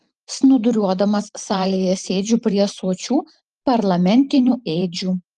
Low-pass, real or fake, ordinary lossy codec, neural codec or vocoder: 10.8 kHz; fake; Opus, 32 kbps; vocoder, 44.1 kHz, 128 mel bands every 512 samples, BigVGAN v2